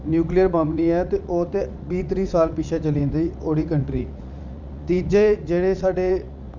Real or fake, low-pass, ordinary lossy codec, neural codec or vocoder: fake; 7.2 kHz; none; vocoder, 44.1 kHz, 80 mel bands, Vocos